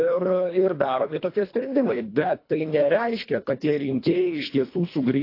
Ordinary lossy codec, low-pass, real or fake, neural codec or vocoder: AAC, 32 kbps; 5.4 kHz; fake; codec, 24 kHz, 1.5 kbps, HILCodec